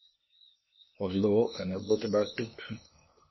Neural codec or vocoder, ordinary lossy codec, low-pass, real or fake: codec, 16 kHz, 0.8 kbps, ZipCodec; MP3, 24 kbps; 7.2 kHz; fake